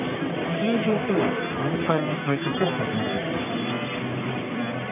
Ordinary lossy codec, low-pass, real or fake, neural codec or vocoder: none; 3.6 kHz; fake; codec, 44.1 kHz, 1.7 kbps, Pupu-Codec